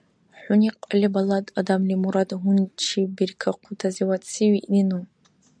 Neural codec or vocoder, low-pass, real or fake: none; 9.9 kHz; real